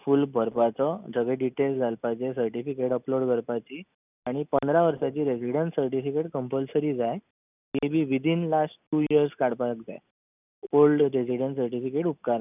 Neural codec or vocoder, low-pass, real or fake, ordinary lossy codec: none; 3.6 kHz; real; none